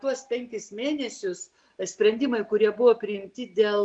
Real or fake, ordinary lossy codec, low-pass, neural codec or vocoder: real; MP3, 96 kbps; 9.9 kHz; none